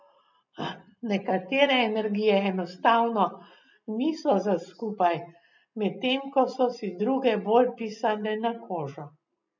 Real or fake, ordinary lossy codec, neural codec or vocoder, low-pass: real; none; none; 7.2 kHz